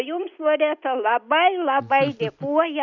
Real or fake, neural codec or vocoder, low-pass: real; none; 7.2 kHz